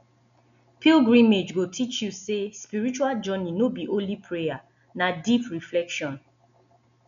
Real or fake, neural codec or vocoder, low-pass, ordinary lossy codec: real; none; 7.2 kHz; none